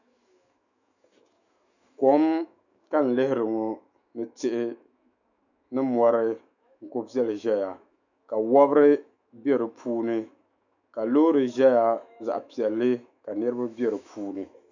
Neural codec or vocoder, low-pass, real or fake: autoencoder, 48 kHz, 128 numbers a frame, DAC-VAE, trained on Japanese speech; 7.2 kHz; fake